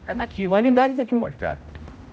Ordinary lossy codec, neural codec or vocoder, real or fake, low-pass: none; codec, 16 kHz, 0.5 kbps, X-Codec, HuBERT features, trained on general audio; fake; none